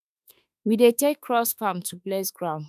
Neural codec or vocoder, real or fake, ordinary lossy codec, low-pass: autoencoder, 48 kHz, 32 numbers a frame, DAC-VAE, trained on Japanese speech; fake; none; 14.4 kHz